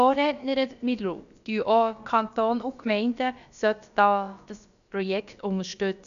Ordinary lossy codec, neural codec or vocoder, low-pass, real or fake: none; codec, 16 kHz, about 1 kbps, DyCAST, with the encoder's durations; 7.2 kHz; fake